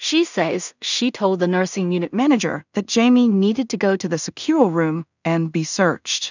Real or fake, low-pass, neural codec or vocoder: fake; 7.2 kHz; codec, 16 kHz in and 24 kHz out, 0.4 kbps, LongCat-Audio-Codec, two codebook decoder